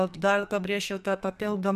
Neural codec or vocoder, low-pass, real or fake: codec, 44.1 kHz, 2.6 kbps, SNAC; 14.4 kHz; fake